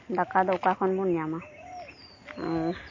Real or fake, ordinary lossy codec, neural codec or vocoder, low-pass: real; MP3, 32 kbps; none; 7.2 kHz